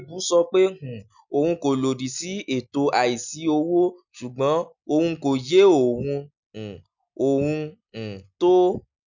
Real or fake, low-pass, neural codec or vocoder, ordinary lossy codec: real; 7.2 kHz; none; none